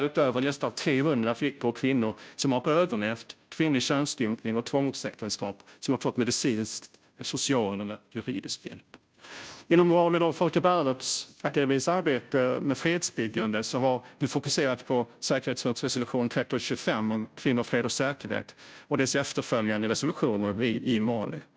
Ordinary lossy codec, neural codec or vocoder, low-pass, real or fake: none; codec, 16 kHz, 0.5 kbps, FunCodec, trained on Chinese and English, 25 frames a second; none; fake